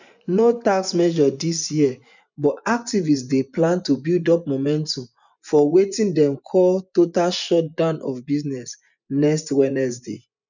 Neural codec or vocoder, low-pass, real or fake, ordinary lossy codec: vocoder, 24 kHz, 100 mel bands, Vocos; 7.2 kHz; fake; none